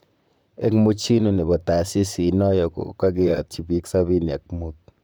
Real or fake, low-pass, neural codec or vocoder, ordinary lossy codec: fake; none; vocoder, 44.1 kHz, 128 mel bands, Pupu-Vocoder; none